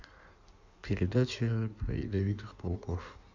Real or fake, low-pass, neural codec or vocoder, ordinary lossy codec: fake; 7.2 kHz; codec, 16 kHz in and 24 kHz out, 1.1 kbps, FireRedTTS-2 codec; none